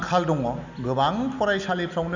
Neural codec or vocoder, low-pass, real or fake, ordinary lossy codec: none; 7.2 kHz; real; none